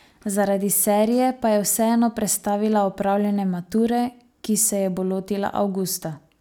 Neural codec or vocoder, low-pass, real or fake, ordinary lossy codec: none; none; real; none